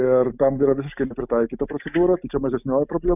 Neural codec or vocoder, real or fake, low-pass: none; real; 3.6 kHz